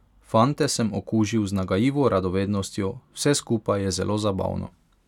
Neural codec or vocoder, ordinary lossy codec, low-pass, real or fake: none; none; 19.8 kHz; real